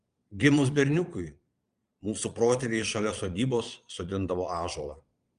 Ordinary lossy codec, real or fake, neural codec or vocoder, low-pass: Opus, 32 kbps; fake; vocoder, 22.05 kHz, 80 mel bands, Vocos; 9.9 kHz